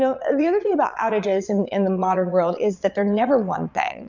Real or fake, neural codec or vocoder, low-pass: fake; vocoder, 22.05 kHz, 80 mel bands, Vocos; 7.2 kHz